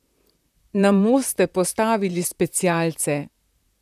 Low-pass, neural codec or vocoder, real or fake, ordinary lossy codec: 14.4 kHz; vocoder, 44.1 kHz, 128 mel bands, Pupu-Vocoder; fake; none